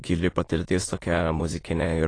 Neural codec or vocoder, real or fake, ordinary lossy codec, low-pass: autoencoder, 22.05 kHz, a latent of 192 numbers a frame, VITS, trained on many speakers; fake; AAC, 32 kbps; 9.9 kHz